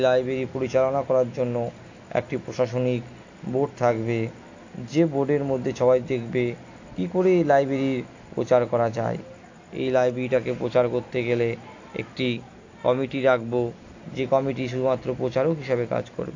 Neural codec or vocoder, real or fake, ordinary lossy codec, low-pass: none; real; AAC, 48 kbps; 7.2 kHz